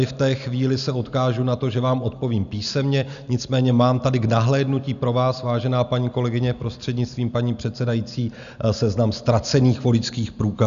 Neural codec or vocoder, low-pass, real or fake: none; 7.2 kHz; real